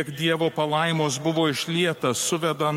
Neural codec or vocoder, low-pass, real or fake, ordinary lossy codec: codec, 44.1 kHz, 7.8 kbps, Pupu-Codec; 19.8 kHz; fake; MP3, 64 kbps